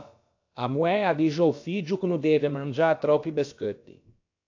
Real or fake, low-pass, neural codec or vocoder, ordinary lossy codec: fake; 7.2 kHz; codec, 16 kHz, about 1 kbps, DyCAST, with the encoder's durations; AAC, 48 kbps